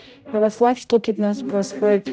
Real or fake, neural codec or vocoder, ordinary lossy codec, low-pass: fake; codec, 16 kHz, 0.5 kbps, X-Codec, HuBERT features, trained on general audio; none; none